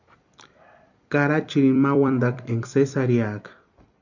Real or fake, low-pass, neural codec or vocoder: fake; 7.2 kHz; vocoder, 44.1 kHz, 128 mel bands every 256 samples, BigVGAN v2